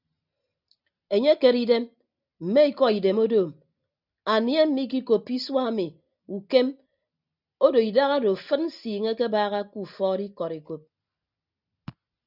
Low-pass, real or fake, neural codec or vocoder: 5.4 kHz; real; none